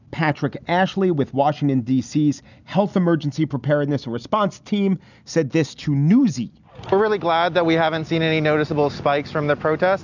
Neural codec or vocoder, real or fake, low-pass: none; real; 7.2 kHz